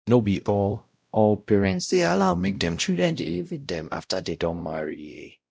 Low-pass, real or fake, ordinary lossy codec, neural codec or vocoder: none; fake; none; codec, 16 kHz, 0.5 kbps, X-Codec, WavLM features, trained on Multilingual LibriSpeech